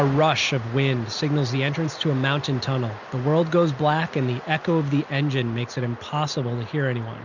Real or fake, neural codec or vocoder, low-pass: real; none; 7.2 kHz